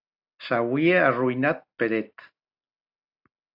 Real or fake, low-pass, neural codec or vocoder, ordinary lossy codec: real; 5.4 kHz; none; AAC, 48 kbps